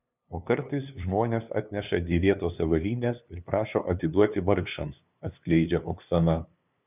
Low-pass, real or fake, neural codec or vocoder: 3.6 kHz; fake; codec, 16 kHz, 2 kbps, FunCodec, trained on LibriTTS, 25 frames a second